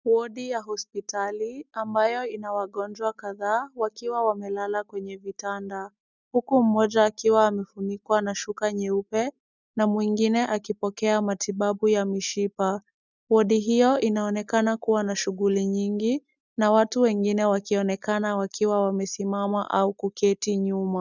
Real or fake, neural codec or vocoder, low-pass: real; none; 7.2 kHz